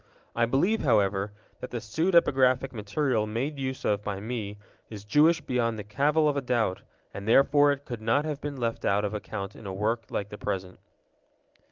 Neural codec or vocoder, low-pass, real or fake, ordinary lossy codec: none; 7.2 kHz; real; Opus, 32 kbps